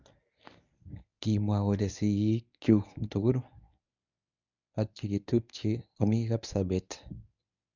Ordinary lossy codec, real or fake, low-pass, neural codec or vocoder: none; fake; 7.2 kHz; codec, 24 kHz, 0.9 kbps, WavTokenizer, medium speech release version 1